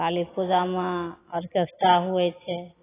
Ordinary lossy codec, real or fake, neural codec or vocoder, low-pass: AAC, 16 kbps; real; none; 3.6 kHz